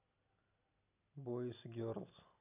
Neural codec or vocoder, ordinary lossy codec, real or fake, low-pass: none; none; real; 3.6 kHz